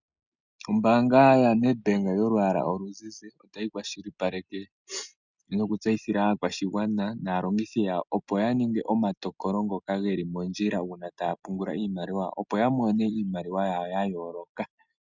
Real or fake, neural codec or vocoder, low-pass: real; none; 7.2 kHz